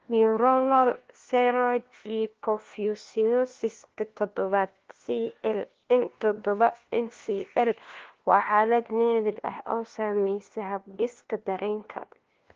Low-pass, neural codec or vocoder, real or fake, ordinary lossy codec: 7.2 kHz; codec, 16 kHz, 1 kbps, FunCodec, trained on LibriTTS, 50 frames a second; fake; Opus, 16 kbps